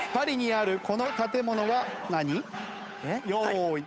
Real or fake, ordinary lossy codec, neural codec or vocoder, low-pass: fake; none; codec, 16 kHz, 8 kbps, FunCodec, trained on Chinese and English, 25 frames a second; none